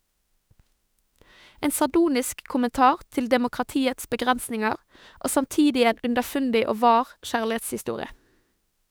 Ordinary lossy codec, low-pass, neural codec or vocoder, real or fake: none; none; autoencoder, 48 kHz, 32 numbers a frame, DAC-VAE, trained on Japanese speech; fake